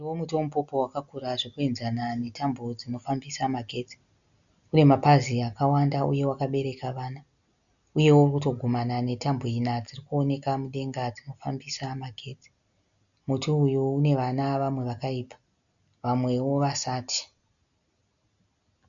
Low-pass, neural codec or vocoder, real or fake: 7.2 kHz; none; real